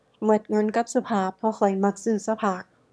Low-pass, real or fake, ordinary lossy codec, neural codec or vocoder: none; fake; none; autoencoder, 22.05 kHz, a latent of 192 numbers a frame, VITS, trained on one speaker